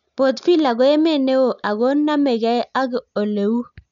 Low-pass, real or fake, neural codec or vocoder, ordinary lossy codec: 7.2 kHz; real; none; none